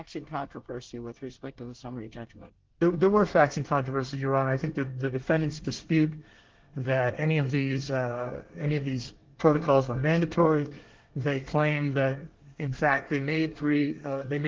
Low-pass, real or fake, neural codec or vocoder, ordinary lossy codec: 7.2 kHz; fake; codec, 24 kHz, 1 kbps, SNAC; Opus, 16 kbps